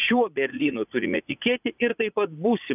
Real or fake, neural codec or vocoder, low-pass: fake; vocoder, 44.1 kHz, 80 mel bands, Vocos; 3.6 kHz